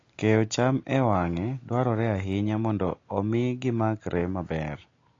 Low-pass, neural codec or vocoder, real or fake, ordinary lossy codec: 7.2 kHz; none; real; AAC, 32 kbps